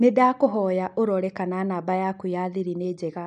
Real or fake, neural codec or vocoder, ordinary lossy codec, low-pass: real; none; none; 9.9 kHz